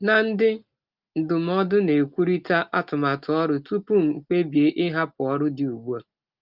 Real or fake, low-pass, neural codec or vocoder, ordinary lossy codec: real; 5.4 kHz; none; Opus, 32 kbps